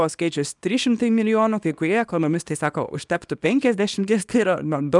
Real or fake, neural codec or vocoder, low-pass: fake; codec, 24 kHz, 0.9 kbps, WavTokenizer, small release; 10.8 kHz